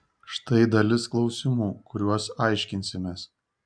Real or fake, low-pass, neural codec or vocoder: real; 9.9 kHz; none